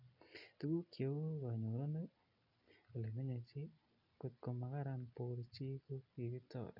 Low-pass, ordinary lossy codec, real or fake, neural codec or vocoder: 5.4 kHz; none; real; none